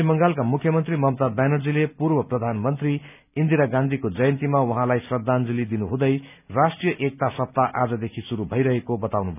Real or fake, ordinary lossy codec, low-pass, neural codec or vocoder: real; none; 3.6 kHz; none